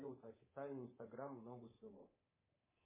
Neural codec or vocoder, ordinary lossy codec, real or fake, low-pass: codec, 24 kHz, 3.1 kbps, DualCodec; MP3, 16 kbps; fake; 3.6 kHz